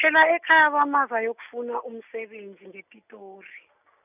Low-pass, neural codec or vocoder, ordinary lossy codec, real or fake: 3.6 kHz; none; none; real